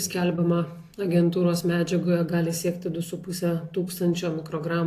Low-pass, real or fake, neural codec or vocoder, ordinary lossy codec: 14.4 kHz; real; none; AAC, 64 kbps